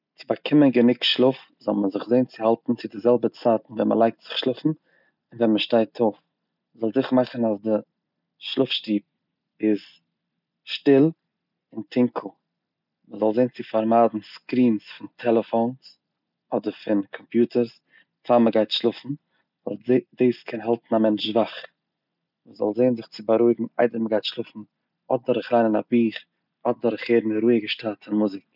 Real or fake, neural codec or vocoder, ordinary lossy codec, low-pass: real; none; none; 5.4 kHz